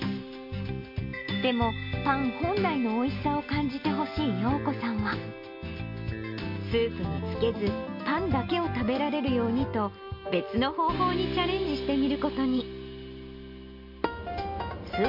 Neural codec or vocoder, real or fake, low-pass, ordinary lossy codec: none; real; 5.4 kHz; MP3, 32 kbps